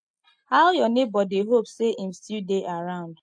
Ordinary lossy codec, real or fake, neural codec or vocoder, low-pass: MP3, 48 kbps; real; none; 9.9 kHz